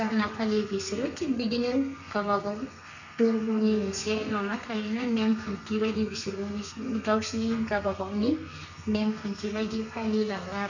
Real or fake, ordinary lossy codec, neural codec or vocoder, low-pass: fake; none; codec, 32 kHz, 1.9 kbps, SNAC; 7.2 kHz